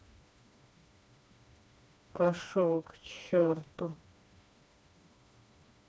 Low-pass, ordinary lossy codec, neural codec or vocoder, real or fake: none; none; codec, 16 kHz, 2 kbps, FreqCodec, smaller model; fake